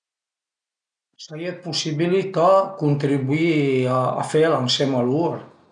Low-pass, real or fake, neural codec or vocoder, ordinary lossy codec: 9.9 kHz; real; none; none